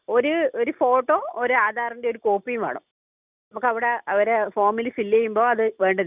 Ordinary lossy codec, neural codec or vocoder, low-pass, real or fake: none; none; 3.6 kHz; real